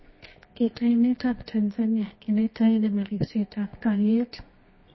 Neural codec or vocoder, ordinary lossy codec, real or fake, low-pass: codec, 24 kHz, 0.9 kbps, WavTokenizer, medium music audio release; MP3, 24 kbps; fake; 7.2 kHz